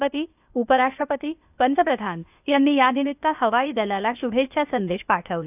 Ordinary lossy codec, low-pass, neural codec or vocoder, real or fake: none; 3.6 kHz; codec, 16 kHz, 0.8 kbps, ZipCodec; fake